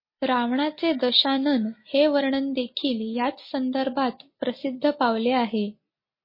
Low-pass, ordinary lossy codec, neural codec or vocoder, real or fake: 5.4 kHz; MP3, 24 kbps; none; real